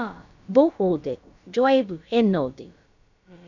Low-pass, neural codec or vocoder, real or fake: 7.2 kHz; codec, 16 kHz, about 1 kbps, DyCAST, with the encoder's durations; fake